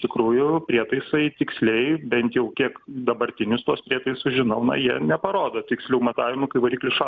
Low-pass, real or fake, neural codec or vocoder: 7.2 kHz; real; none